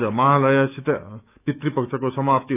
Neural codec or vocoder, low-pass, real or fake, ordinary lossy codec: autoencoder, 48 kHz, 128 numbers a frame, DAC-VAE, trained on Japanese speech; 3.6 kHz; fake; none